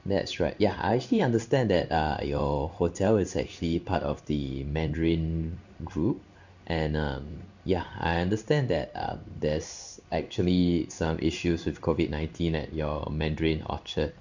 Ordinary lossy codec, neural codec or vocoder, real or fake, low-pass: none; codec, 16 kHz in and 24 kHz out, 1 kbps, XY-Tokenizer; fake; 7.2 kHz